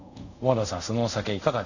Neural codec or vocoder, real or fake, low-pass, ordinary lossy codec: codec, 24 kHz, 0.5 kbps, DualCodec; fake; 7.2 kHz; none